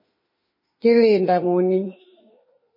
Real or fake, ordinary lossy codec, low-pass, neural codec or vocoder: fake; MP3, 24 kbps; 5.4 kHz; autoencoder, 48 kHz, 32 numbers a frame, DAC-VAE, trained on Japanese speech